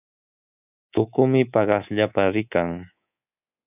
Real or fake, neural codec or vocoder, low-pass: fake; codec, 24 kHz, 3.1 kbps, DualCodec; 3.6 kHz